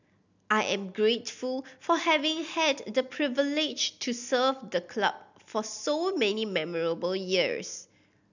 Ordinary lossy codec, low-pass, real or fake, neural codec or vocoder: none; 7.2 kHz; real; none